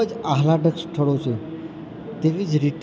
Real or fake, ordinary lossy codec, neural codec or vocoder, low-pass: real; none; none; none